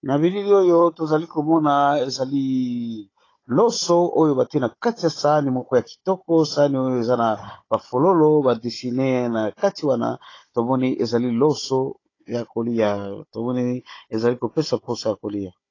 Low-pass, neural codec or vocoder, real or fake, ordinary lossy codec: 7.2 kHz; codec, 16 kHz, 16 kbps, FunCodec, trained on Chinese and English, 50 frames a second; fake; AAC, 32 kbps